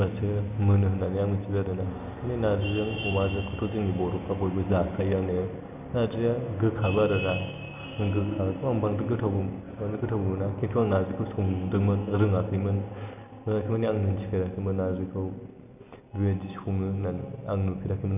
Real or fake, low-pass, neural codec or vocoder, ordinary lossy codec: real; 3.6 kHz; none; none